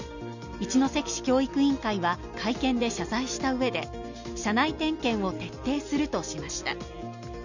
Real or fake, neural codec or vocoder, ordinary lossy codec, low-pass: real; none; none; 7.2 kHz